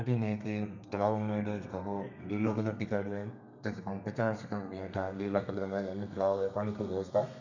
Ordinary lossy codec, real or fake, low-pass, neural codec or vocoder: none; fake; 7.2 kHz; codec, 32 kHz, 1.9 kbps, SNAC